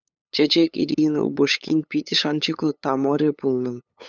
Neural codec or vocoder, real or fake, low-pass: codec, 16 kHz, 8 kbps, FunCodec, trained on LibriTTS, 25 frames a second; fake; 7.2 kHz